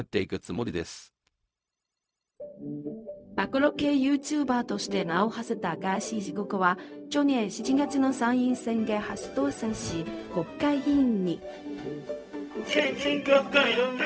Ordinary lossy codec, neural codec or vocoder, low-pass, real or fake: none; codec, 16 kHz, 0.4 kbps, LongCat-Audio-Codec; none; fake